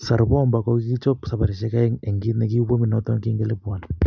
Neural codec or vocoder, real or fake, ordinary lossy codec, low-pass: none; real; none; 7.2 kHz